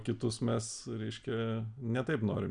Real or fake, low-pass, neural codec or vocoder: real; 9.9 kHz; none